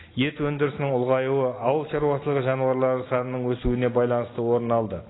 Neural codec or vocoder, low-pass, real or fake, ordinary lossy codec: none; 7.2 kHz; real; AAC, 16 kbps